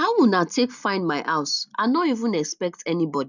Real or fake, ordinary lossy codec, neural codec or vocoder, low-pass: real; none; none; 7.2 kHz